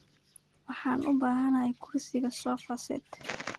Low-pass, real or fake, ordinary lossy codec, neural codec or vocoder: 10.8 kHz; real; Opus, 16 kbps; none